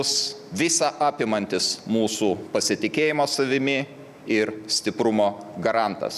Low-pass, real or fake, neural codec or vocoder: 14.4 kHz; real; none